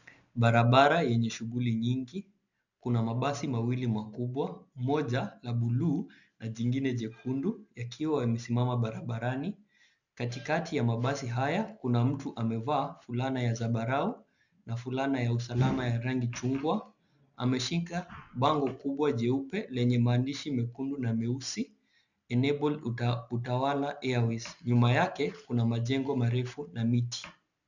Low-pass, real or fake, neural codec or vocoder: 7.2 kHz; real; none